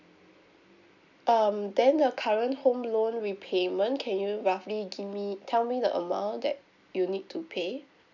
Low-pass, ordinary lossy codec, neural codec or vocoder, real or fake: 7.2 kHz; none; none; real